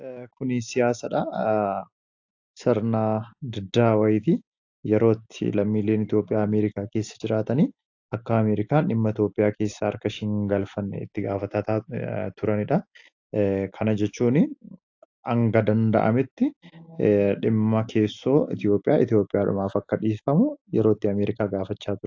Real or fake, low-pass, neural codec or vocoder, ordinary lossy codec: real; 7.2 kHz; none; AAC, 48 kbps